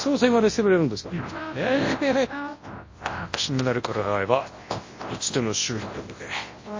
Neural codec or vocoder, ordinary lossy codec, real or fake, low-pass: codec, 24 kHz, 0.9 kbps, WavTokenizer, large speech release; MP3, 32 kbps; fake; 7.2 kHz